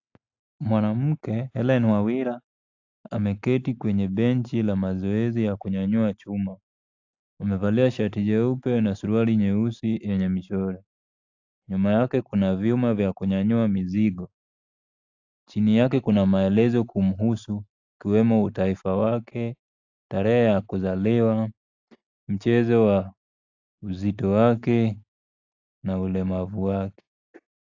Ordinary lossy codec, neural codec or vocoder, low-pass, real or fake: AAC, 48 kbps; none; 7.2 kHz; real